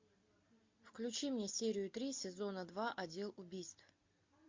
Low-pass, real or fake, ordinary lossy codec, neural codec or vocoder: 7.2 kHz; real; MP3, 48 kbps; none